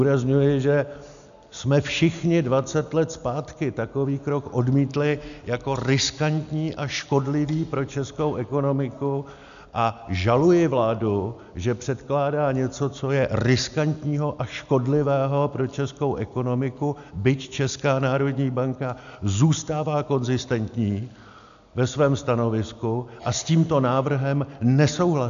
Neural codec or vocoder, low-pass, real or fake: none; 7.2 kHz; real